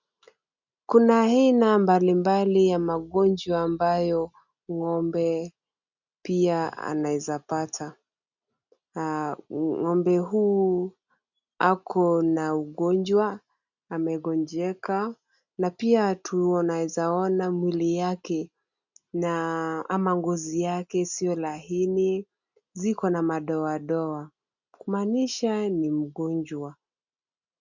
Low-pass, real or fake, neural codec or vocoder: 7.2 kHz; real; none